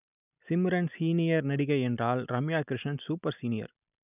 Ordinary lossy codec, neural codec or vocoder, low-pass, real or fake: none; none; 3.6 kHz; real